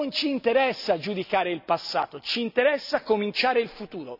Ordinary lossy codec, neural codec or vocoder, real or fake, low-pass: none; none; real; 5.4 kHz